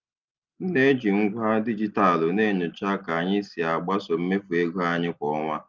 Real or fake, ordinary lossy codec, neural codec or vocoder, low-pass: real; Opus, 24 kbps; none; 7.2 kHz